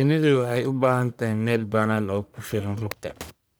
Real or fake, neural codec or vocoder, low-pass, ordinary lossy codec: fake; codec, 44.1 kHz, 1.7 kbps, Pupu-Codec; none; none